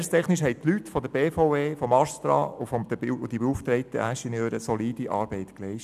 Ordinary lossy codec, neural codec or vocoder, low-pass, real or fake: none; none; 14.4 kHz; real